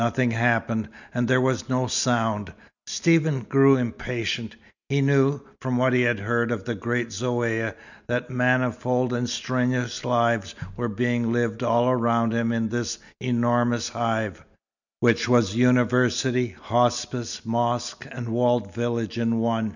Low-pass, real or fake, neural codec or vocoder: 7.2 kHz; real; none